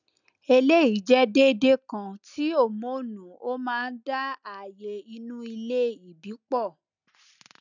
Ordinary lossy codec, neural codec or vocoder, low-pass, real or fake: none; none; 7.2 kHz; real